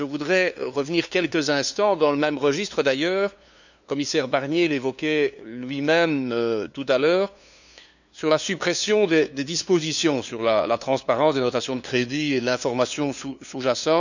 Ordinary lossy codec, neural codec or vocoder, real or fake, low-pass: none; codec, 16 kHz, 2 kbps, FunCodec, trained on LibriTTS, 25 frames a second; fake; 7.2 kHz